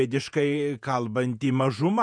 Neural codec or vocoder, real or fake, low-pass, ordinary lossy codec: vocoder, 48 kHz, 128 mel bands, Vocos; fake; 9.9 kHz; MP3, 96 kbps